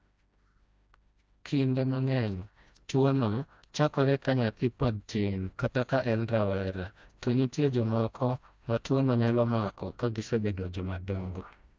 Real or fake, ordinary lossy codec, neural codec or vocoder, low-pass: fake; none; codec, 16 kHz, 1 kbps, FreqCodec, smaller model; none